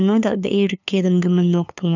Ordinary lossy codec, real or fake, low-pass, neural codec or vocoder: none; fake; 7.2 kHz; codec, 16 kHz, 2 kbps, FunCodec, trained on LibriTTS, 25 frames a second